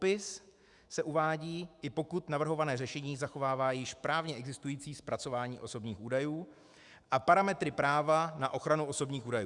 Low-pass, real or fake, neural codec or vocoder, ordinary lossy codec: 10.8 kHz; fake; autoencoder, 48 kHz, 128 numbers a frame, DAC-VAE, trained on Japanese speech; Opus, 64 kbps